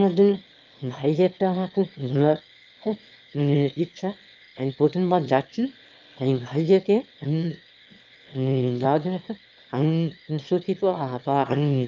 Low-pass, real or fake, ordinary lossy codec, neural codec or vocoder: 7.2 kHz; fake; Opus, 24 kbps; autoencoder, 22.05 kHz, a latent of 192 numbers a frame, VITS, trained on one speaker